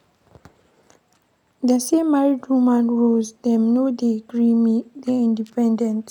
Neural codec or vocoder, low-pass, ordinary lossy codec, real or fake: none; 19.8 kHz; none; real